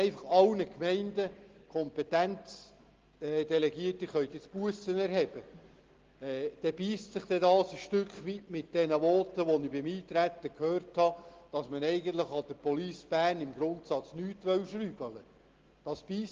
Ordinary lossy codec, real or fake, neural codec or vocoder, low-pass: Opus, 16 kbps; real; none; 7.2 kHz